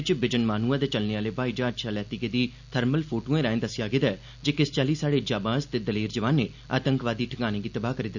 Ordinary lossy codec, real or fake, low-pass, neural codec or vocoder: none; real; 7.2 kHz; none